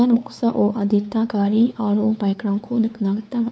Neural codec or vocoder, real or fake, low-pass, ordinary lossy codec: codec, 16 kHz, 4 kbps, X-Codec, WavLM features, trained on Multilingual LibriSpeech; fake; none; none